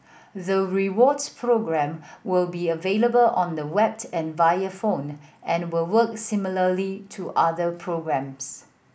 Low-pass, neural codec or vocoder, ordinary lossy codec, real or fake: none; none; none; real